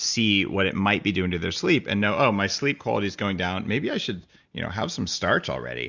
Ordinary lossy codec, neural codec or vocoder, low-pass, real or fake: Opus, 64 kbps; none; 7.2 kHz; real